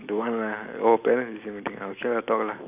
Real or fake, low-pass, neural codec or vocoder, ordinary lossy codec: real; 3.6 kHz; none; none